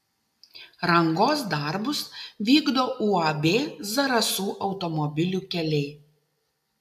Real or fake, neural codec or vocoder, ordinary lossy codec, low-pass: real; none; AAC, 96 kbps; 14.4 kHz